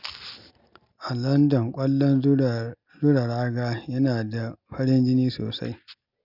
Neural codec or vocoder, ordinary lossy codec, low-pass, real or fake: none; none; 5.4 kHz; real